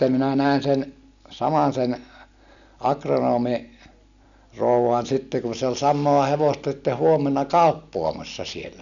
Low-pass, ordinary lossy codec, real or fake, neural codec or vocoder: 7.2 kHz; none; real; none